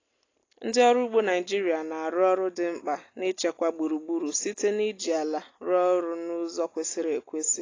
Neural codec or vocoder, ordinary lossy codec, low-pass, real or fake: none; AAC, 32 kbps; 7.2 kHz; real